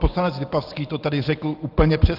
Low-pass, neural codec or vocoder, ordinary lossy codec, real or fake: 5.4 kHz; none; Opus, 32 kbps; real